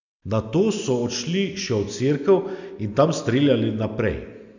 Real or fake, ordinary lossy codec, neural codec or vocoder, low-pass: real; none; none; 7.2 kHz